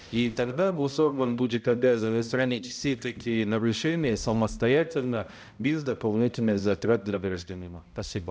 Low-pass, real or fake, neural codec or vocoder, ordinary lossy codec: none; fake; codec, 16 kHz, 0.5 kbps, X-Codec, HuBERT features, trained on balanced general audio; none